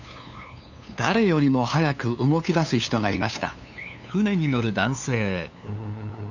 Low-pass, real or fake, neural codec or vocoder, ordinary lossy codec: 7.2 kHz; fake; codec, 16 kHz, 2 kbps, FunCodec, trained on LibriTTS, 25 frames a second; none